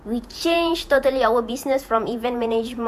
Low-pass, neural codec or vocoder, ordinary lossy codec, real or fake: 14.4 kHz; vocoder, 48 kHz, 128 mel bands, Vocos; AAC, 96 kbps; fake